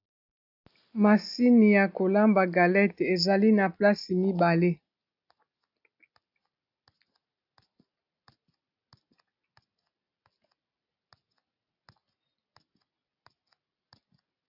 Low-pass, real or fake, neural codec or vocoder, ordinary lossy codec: 5.4 kHz; real; none; AAC, 48 kbps